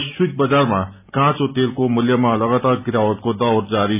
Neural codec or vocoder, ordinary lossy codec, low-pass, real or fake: none; none; 3.6 kHz; real